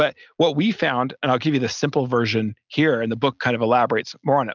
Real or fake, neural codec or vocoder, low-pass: real; none; 7.2 kHz